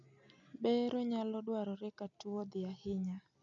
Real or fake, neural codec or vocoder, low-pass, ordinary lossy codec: real; none; 7.2 kHz; none